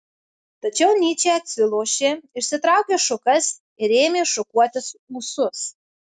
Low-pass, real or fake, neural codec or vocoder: 9.9 kHz; real; none